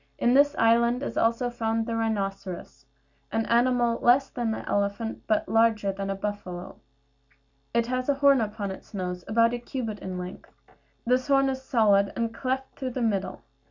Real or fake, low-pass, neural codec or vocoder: real; 7.2 kHz; none